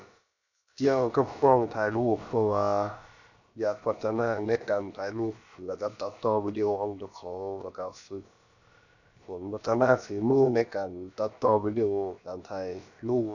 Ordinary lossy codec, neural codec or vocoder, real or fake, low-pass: none; codec, 16 kHz, about 1 kbps, DyCAST, with the encoder's durations; fake; 7.2 kHz